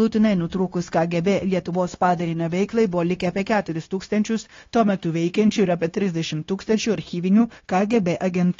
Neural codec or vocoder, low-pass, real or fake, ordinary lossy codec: codec, 16 kHz, 0.9 kbps, LongCat-Audio-Codec; 7.2 kHz; fake; AAC, 32 kbps